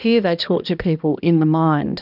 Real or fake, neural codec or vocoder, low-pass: fake; codec, 16 kHz, 1 kbps, X-Codec, HuBERT features, trained on balanced general audio; 5.4 kHz